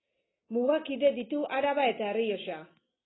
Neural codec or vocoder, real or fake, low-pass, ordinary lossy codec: none; real; 7.2 kHz; AAC, 16 kbps